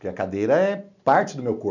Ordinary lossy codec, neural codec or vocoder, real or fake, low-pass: none; none; real; 7.2 kHz